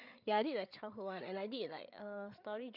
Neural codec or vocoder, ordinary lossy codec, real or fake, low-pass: codec, 16 kHz, 8 kbps, FreqCodec, larger model; none; fake; 5.4 kHz